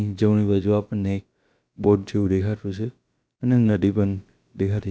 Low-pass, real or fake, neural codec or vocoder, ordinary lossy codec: none; fake; codec, 16 kHz, about 1 kbps, DyCAST, with the encoder's durations; none